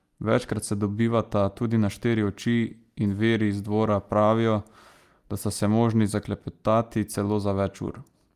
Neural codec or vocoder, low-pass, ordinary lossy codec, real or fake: none; 14.4 kHz; Opus, 32 kbps; real